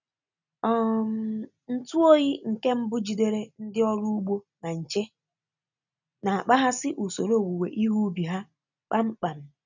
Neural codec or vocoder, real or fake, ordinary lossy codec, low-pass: none; real; none; 7.2 kHz